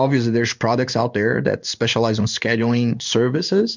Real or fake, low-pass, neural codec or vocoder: fake; 7.2 kHz; codec, 16 kHz in and 24 kHz out, 1 kbps, XY-Tokenizer